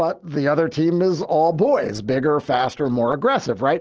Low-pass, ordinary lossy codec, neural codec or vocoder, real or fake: 7.2 kHz; Opus, 16 kbps; none; real